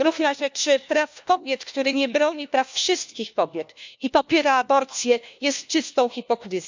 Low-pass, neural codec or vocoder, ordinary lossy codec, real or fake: 7.2 kHz; codec, 16 kHz, 1 kbps, FunCodec, trained on LibriTTS, 50 frames a second; MP3, 64 kbps; fake